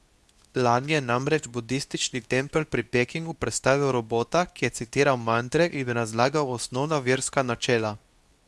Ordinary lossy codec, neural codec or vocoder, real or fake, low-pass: none; codec, 24 kHz, 0.9 kbps, WavTokenizer, medium speech release version 2; fake; none